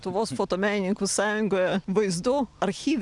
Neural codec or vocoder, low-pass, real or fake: none; 10.8 kHz; real